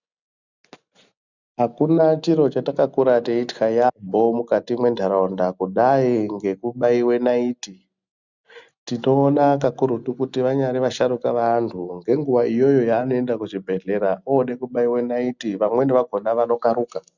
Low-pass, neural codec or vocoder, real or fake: 7.2 kHz; none; real